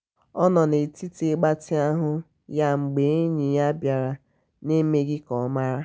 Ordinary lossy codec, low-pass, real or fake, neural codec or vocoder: none; none; real; none